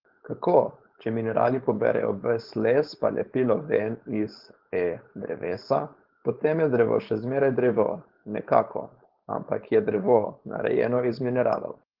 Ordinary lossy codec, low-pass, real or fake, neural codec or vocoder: Opus, 16 kbps; 5.4 kHz; fake; codec, 16 kHz, 4.8 kbps, FACodec